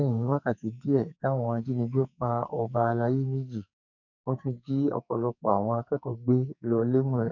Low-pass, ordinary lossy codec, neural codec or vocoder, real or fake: 7.2 kHz; none; codec, 44.1 kHz, 2.6 kbps, SNAC; fake